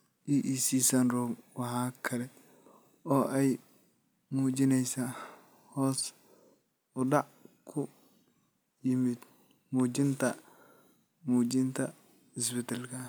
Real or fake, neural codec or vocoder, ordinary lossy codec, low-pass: real; none; none; none